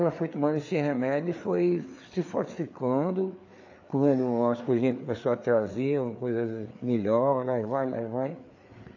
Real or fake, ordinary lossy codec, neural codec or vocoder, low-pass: fake; AAC, 48 kbps; codec, 16 kHz, 4 kbps, FreqCodec, larger model; 7.2 kHz